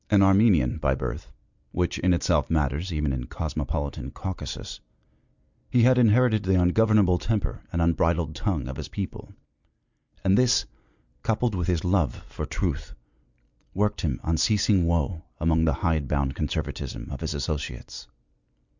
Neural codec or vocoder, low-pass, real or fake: none; 7.2 kHz; real